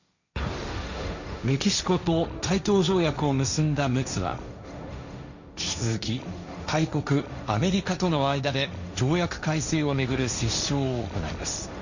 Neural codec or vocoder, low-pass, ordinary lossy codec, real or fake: codec, 16 kHz, 1.1 kbps, Voila-Tokenizer; 7.2 kHz; none; fake